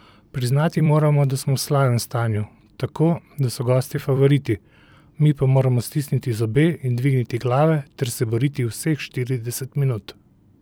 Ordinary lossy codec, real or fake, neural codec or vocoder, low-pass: none; fake; vocoder, 44.1 kHz, 128 mel bands every 256 samples, BigVGAN v2; none